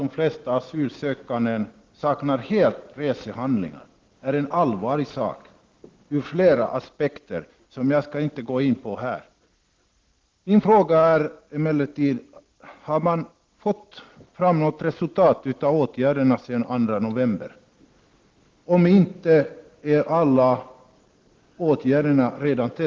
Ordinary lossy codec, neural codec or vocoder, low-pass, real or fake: Opus, 16 kbps; none; 7.2 kHz; real